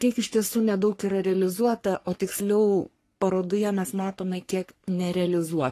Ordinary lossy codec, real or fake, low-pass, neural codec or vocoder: AAC, 48 kbps; fake; 14.4 kHz; codec, 44.1 kHz, 3.4 kbps, Pupu-Codec